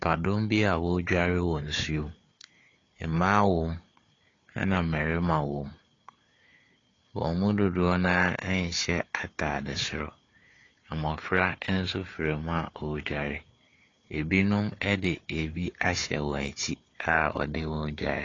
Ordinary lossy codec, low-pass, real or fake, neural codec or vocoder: AAC, 32 kbps; 7.2 kHz; fake; codec, 16 kHz, 4 kbps, FunCodec, trained on Chinese and English, 50 frames a second